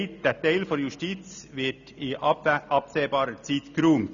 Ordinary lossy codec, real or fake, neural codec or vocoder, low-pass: none; real; none; 7.2 kHz